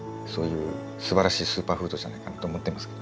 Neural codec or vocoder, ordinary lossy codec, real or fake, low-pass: none; none; real; none